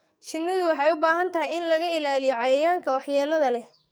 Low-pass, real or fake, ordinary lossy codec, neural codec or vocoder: none; fake; none; codec, 44.1 kHz, 2.6 kbps, SNAC